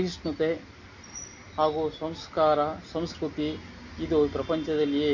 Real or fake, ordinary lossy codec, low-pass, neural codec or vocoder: real; none; 7.2 kHz; none